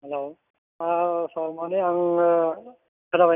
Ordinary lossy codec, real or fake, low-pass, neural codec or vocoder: none; real; 3.6 kHz; none